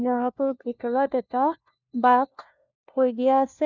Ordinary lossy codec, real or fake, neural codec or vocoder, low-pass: none; fake; codec, 16 kHz, 1 kbps, FunCodec, trained on LibriTTS, 50 frames a second; 7.2 kHz